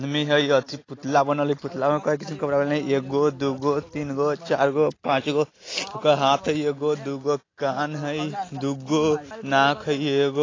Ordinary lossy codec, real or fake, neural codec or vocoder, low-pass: AAC, 32 kbps; real; none; 7.2 kHz